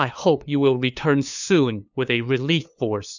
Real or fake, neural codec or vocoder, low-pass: fake; codec, 16 kHz, 2 kbps, FunCodec, trained on LibriTTS, 25 frames a second; 7.2 kHz